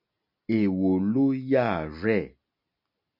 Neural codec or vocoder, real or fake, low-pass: none; real; 5.4 kHz